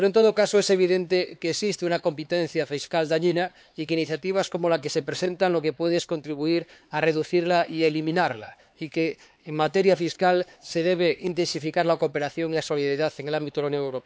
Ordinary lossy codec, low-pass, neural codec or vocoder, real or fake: none; none; codec, 16 kHz, 4 kbps, X-Codec, HuBERT features, trained on LibriSpeech; fake